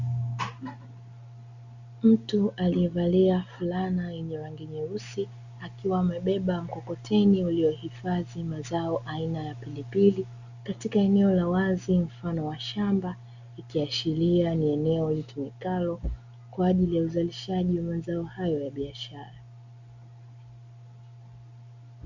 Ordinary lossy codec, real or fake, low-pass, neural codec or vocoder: Opus, 64 kbps; real; 7.2 kHz; none